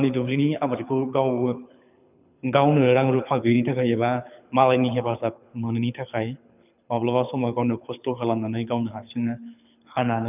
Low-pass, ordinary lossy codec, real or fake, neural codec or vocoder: 3.6 kHz; none; fake; codec, 24 kHz, 6 kbps, HILCodec